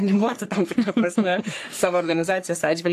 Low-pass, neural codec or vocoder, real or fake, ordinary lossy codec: 14.4 kHz; codec, 44.1 kHz, 3.4 kbps, Pupu-Codec; fake; AAC, 64 kbps